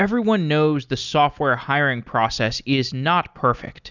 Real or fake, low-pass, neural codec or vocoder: real; 7.2 kHz; none